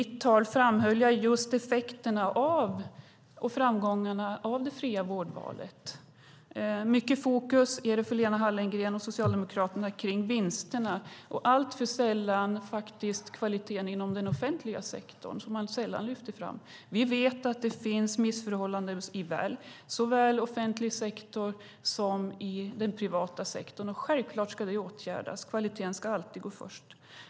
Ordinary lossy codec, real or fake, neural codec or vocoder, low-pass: none; real; none; none